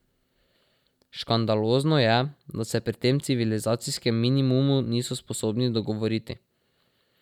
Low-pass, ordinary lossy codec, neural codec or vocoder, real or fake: 19.8 kHz; none; none; real